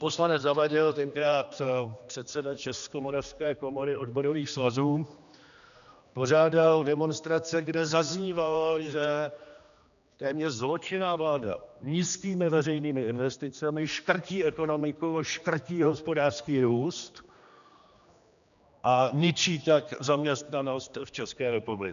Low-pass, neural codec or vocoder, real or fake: 7.2 kHz; codec, 16 kHz, 2 kbps, X-Codec, HuBERT features, trained on general audio; fake